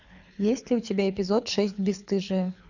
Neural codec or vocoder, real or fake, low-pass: codec, 24 kHz, 6 kbps, HILCodec; fake; 7.2 kHz